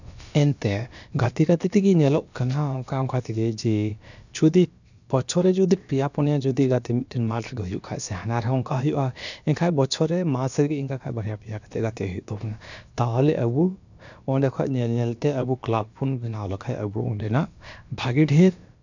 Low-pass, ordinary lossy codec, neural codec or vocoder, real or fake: 7.2 kHz; none; codec, 16 kHz, about 1 kbps, DyCAST, with the encoder's durations; fake